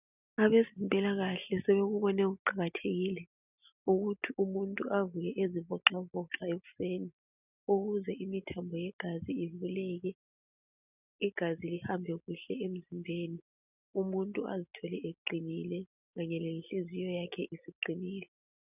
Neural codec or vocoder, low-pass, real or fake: none; 3.6 kHz; real